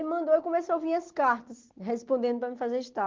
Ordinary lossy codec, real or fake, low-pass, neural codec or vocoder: Opus, 16 kbps; real; 7.2 kHz; none